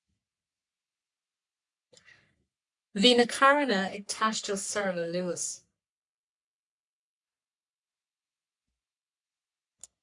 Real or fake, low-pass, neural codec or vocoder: fake; 10.8 kHz; codec, 44.1 kHz, 3.4 kbps, Pupu-Codec